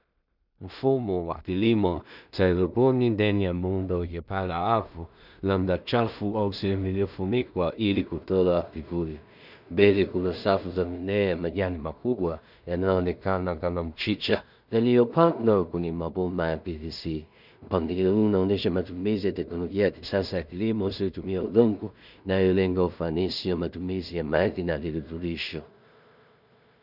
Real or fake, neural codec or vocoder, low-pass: fake; codec, 16 kHz in and 24 kHz out, 0.4 kbps, LongCat-Audio-Codec, two codebook decoder; 5.4 kHz